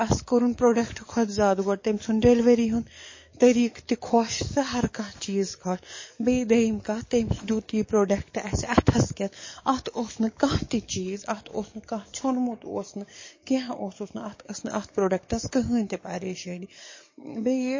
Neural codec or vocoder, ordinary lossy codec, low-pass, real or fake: codec, 44.1 kHz, 7.8 kbps, DAC; MP3, 32 kbps; 7.2 kHz; fake